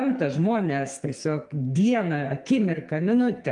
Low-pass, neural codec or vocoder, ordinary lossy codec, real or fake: 10.8 kHz; codec, 44.1 kHz, 2.6 kbps, SNAC; Opus, 32 kbps; fake